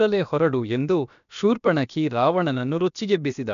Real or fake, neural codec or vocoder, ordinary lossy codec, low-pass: fake; codec, 16 kHz, about 1 kbps, DyCAST, with the encoder's durations; none; 7.2 kHz